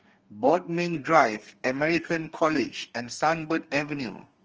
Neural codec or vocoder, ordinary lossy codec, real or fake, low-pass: codec, 32 kHz, 1.9 kbps, SNAC; Opus, 24 kbps; fake; 7.2 kHz